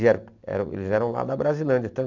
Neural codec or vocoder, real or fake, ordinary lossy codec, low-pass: none; real; none; 7.2 kHz